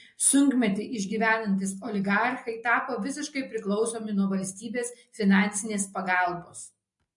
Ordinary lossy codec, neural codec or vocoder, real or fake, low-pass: MP3, 48 kbps; none; real; 10.8 kHz